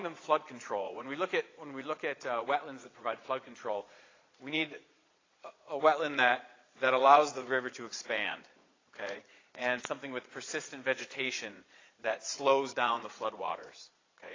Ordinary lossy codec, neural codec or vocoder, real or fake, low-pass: AAC, 32 kbps; vocoder, 22.05 kHz, 80 mel bands, Vocos; fake; 7.2 kHz